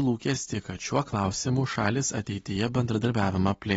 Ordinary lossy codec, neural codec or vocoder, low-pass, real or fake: AAC, 24 kbps; none; 19.8 kHz; real